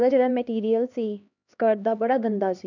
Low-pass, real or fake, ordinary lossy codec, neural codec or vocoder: 7.2 kHz; fake; none; codec, 16 kHz, 2 kbps, X-Codec, HuBERT features, trained on LibriSpeech